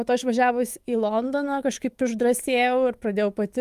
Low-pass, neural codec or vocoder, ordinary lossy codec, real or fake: 14.4 kHz; autoencoder, 48 kHz, 128 numbers a frame, DAC-VAE, trained on Japanese speech; Opus, 32 kbps; fake